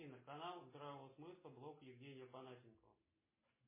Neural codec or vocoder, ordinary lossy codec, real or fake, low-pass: none; MP3, 16 kbps; real; 3.6 kHz